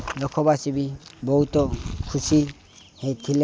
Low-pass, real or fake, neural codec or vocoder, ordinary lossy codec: none; real; none; none